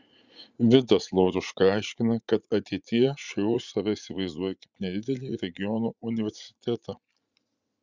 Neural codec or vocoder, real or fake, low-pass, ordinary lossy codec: vocoder, 44.1 kHz, 80 mel bands, Vocos; fake; 7.2 kHz; Opus, 64 kbps